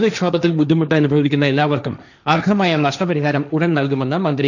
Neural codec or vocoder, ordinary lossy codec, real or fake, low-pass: codec, 16 kHz, 1.1 kbps, Voila-Tokenizer; none; fake; 7.2 kHz